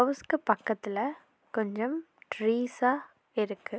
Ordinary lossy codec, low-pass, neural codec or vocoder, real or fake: none; none; none; real